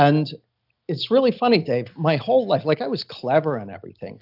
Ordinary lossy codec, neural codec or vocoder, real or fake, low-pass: AAC, 48 kbps; none; real; 5.4 kHz